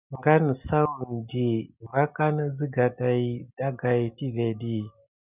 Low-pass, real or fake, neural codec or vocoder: 3.6 kHz; real; none